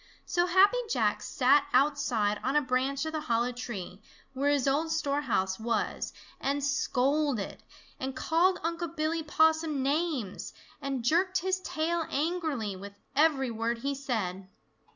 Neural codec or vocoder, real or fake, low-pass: none; real; 7.2 kHz